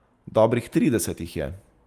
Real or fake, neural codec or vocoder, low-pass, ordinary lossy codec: real; none; 14.4 kHz; Opus, 32 kbps